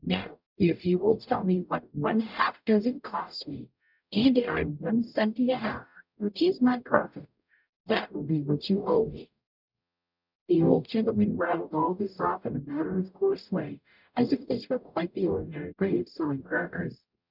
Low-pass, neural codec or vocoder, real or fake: 5.4 kHz; codec, 44.1 kHz, 0.9 kbps, DAC; fake